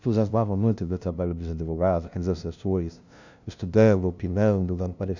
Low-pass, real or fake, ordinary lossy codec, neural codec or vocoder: 7.2 kHz; fake; none; codec, 16 kHz, 0.5 kbps, FunCodec, trained on LibriTTS, 25 frames a second